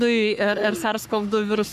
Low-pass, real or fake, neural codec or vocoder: 14.4 kHz; fake; codec, 44.1 kHz, 3.4 kbps, Pupu-Codec